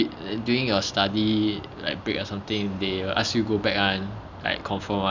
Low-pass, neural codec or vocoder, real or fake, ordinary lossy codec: 7.2 kHz; none; real; none